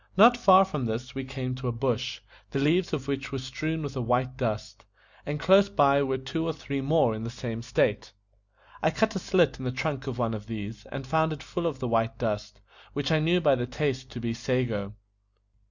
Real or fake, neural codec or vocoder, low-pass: real; none; 7.2 kHz